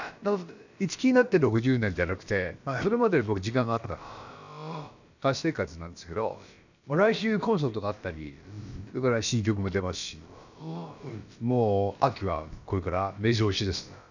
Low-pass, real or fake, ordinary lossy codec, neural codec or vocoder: 7.2 kHz; fake; none; codec, 16 kHz, about 1 kbps, DyCAST, with the encoder's durations